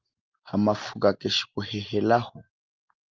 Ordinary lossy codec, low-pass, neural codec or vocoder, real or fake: Opus, 24 kbps; 7.2 kHz; none; real